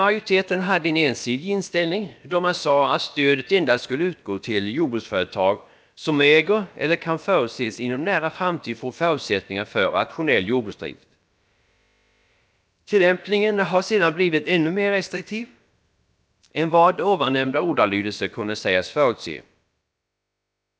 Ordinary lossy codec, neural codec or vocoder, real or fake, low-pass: none; codec, 16 kHz, about 1 kbps, DyCAST, with the encoder's durations; fake; none